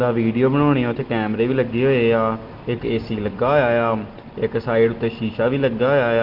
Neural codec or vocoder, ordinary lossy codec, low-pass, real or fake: none; Opus, 16 kbps; 5.4 kHz; real